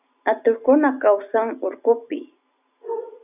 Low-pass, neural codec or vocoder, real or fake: 3.6 kHz; none; real